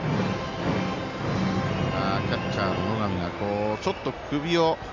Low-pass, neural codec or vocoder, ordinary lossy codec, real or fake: 7.2 kHz; none; MP3, 64 kbps; real